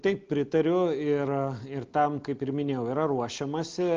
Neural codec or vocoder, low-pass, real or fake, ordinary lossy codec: none; 7.2 kHz; real; Opus, 24 kbps